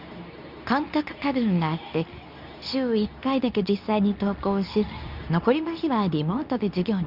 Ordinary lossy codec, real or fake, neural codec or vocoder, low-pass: none; fake; codec, 24 kHz, 0.9 kbps, WavTokenizer, medium speech release version 2; 5.4 kHz